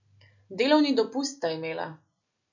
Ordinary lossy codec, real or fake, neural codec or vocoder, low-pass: none; real; none; 7.2 kHz